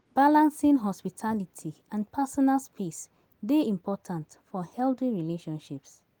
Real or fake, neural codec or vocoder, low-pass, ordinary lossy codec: real; none; 19.8 kHz; Opus, 24 kbps